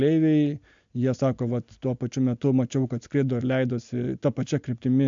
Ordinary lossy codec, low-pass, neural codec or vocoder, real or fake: MP3, 64 kbps; 7.2 kHz; none; real